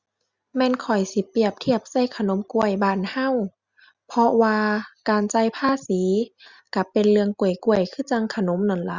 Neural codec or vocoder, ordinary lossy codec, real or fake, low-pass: none; Opus, 64 kbps; real; 7.2 kHz